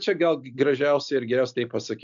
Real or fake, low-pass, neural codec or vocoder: real; 7.2 kHz; none